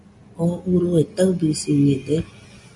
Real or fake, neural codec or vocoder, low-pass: real; none; 10.8 kHz